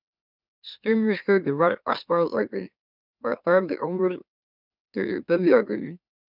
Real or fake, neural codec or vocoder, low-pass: fake; autoencoder, 44.1 kHz, a latent of 192 numbers a frame, MeloTTS; 5.4 kHz